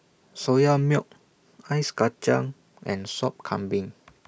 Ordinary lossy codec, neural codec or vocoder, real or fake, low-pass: none; none; real; none